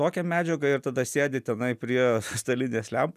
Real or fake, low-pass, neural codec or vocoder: real; 14.4 kHz; none